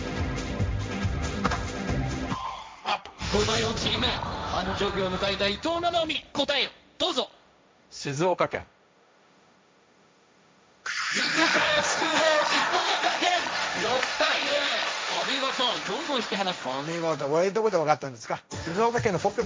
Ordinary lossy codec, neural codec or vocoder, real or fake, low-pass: none; codec, 16 kHz, 1.1 kbps, Voila-Tokenizer; fake; none